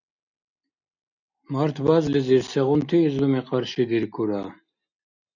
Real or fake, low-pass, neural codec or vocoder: real; 7.2 kHz; none